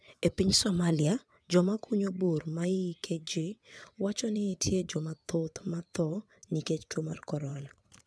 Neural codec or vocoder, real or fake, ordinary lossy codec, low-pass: vocoder, 22.05 kHz, 80 mel bands, WaveNeXt; fake; none; none